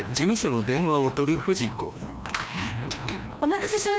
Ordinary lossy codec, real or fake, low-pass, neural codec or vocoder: none; fake; none; codec, 16 kHz, 1 kbps, FreqCodec, larger model